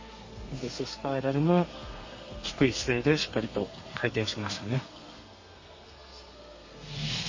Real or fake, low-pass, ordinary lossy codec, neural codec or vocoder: fake; 7.2 kHz; MP3, 32 kbps; codec, 32 kHz, 1.9 kbps, SNAC